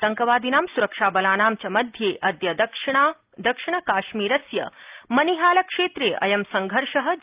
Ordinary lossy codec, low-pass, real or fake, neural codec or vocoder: Opus, 32 kbps; 3.6 kHz; real; none